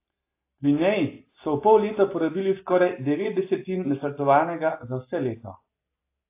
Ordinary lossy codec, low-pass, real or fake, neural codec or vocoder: AAC, 24 kbps; 3.6 kHz; real; none